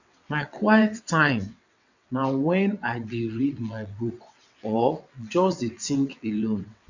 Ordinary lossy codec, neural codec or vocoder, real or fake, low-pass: none; vocoder, 22.05 kHz, 80 mel bands, WaveNeXt; fake; 7.2 kHz